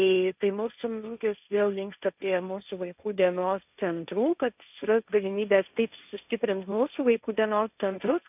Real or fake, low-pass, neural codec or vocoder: fake; 3.6 kHz; codec, 16 kHz, 1.1 kbps, Voila-Tokenizer